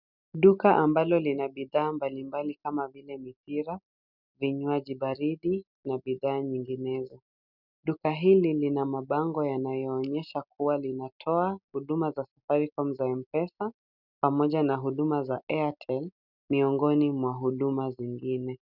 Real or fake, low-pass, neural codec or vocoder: real; 5.4 kHz; none